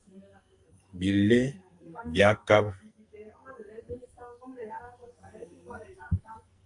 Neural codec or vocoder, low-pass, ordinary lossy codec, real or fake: codec, 44.1 kHz, 2.6 kbps, SNAC; 10.8 kHz; AAC, 64 kbps; fake